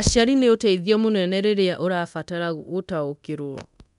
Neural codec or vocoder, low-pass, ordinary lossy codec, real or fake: codec, 24 kHz, 0.9 kbps, DualCodec; 10.8 kHz; none; fake